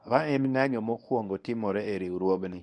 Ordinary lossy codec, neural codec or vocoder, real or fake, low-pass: none; codec, 24 kHz, 0.9 kbps, WavTokenizer, medium speech release version 1; fake; none